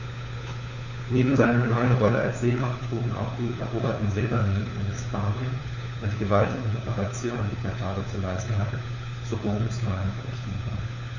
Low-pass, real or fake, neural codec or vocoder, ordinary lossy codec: 7.2 kHz; fake; codec, 16 kHz, 4 kbps, FunCodec, trained on LibriTTS, 50 frames a second; none